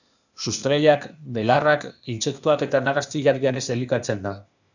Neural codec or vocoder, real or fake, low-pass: codec, 16 kHz, 0.8 kbps, ZipCodec; fake; 7.2 kHz